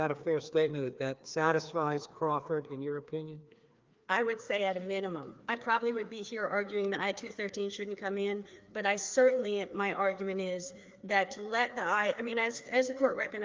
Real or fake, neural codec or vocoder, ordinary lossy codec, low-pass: fake; codec, 16 kHz, 2 kbps, FreqCodec, larger model; Opus, 32 kbps; 7.2 kHz